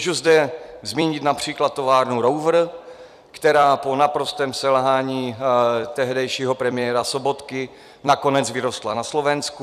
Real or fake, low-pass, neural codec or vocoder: fake; 14.4 kHz; vocoder, 44.1 kHz, 128 mel bands every 256 samples, BigVGAN v2